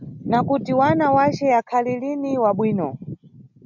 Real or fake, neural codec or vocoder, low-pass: real; none; 7.2 kHz